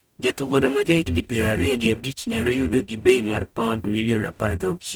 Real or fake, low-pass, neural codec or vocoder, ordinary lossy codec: fake; none; codec, 44.1 kHz, 0.9 kbps, DAC; none